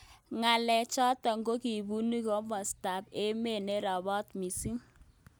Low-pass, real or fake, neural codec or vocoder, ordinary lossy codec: none; real; none; none